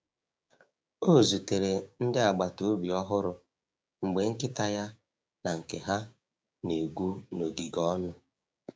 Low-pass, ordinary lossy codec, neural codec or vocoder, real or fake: none; none; codec, 16 kHz, 6 kbps, DAC; fake